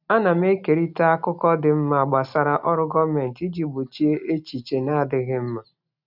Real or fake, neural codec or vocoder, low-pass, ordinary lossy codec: real; none; 5.4 kHz; none